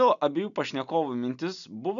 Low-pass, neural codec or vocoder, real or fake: 7.2 kHz; none; real